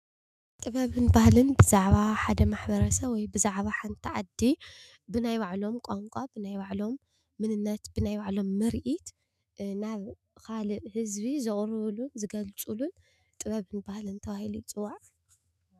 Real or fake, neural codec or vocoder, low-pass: fake; autoencoder, 48 kHz, 128 numbers a frame, DAC-VAE, trained on Japanese speech; 14.4 kHz